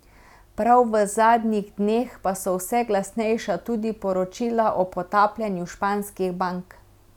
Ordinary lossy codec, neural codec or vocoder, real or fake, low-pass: none; none; real; 19.8 kHz